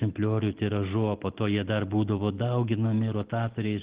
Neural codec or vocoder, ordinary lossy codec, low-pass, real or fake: none; Opus, 16 kbps; 3.6 kHz; real